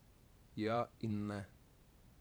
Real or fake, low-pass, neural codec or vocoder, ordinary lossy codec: fake; none; vocoder, 44.1 kHz, 128 mel bands every 512 samples, BigVGAN v2; none